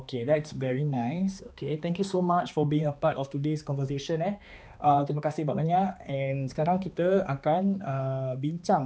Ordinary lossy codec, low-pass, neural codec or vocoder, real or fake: none; none; codec, 16 kHz, 2 kbps, X-Codec, HuBERT features, trained on balanced general audio; fake